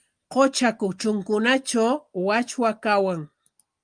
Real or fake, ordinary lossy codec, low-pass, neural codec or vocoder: real; Opus, 32 kbps; 9.9 kHz; none